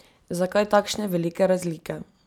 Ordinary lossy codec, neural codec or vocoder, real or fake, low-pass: none; none; real; 19.8 kHz